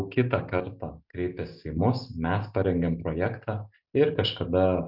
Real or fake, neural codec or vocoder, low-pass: real; none; 5.4 kHz